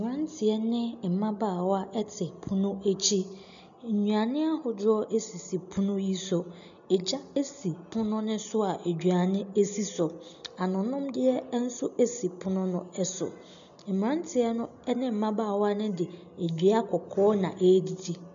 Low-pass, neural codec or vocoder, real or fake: 7.2 kHz; none; real